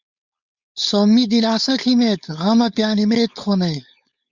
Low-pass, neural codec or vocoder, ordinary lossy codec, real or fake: 7.2 kHz; codec, 16 kHz, 4.8 kbps, FACodec; Opus, 64 kbps; fake